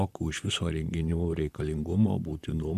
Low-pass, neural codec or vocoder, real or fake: 14.4 kHz; vocoder, 44.1 kHz, 128 mel bands, Pupu-Vocoder; fake